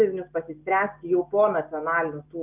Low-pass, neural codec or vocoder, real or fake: 3.6 kHz; none; real